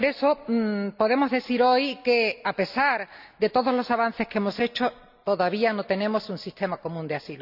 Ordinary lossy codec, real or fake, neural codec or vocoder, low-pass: none; real; none; 5.4 kHz